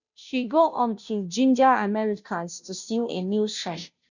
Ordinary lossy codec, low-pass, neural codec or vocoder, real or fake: none; 7.2 kHz; codec, 16 kHz, 0.5 kbps, FunCodec, trained on Chinese and English, 25 frames a second; fake